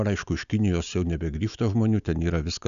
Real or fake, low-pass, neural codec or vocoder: real; 7.2 kHz; none